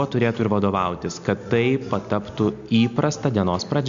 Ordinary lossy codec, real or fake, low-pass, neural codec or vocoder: MP3, 64 kbps; real; 7.2 kHz; none